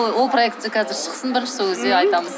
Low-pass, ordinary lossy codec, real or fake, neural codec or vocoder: none; none; real; none